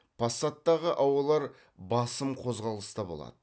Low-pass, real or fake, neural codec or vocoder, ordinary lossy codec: none; real; none; none